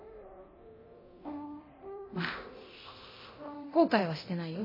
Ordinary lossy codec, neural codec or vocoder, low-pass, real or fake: MP3, 24 kbps; codec, 24 kHz, 0.9 kbps, DualCodec; 5.4 kHz; fake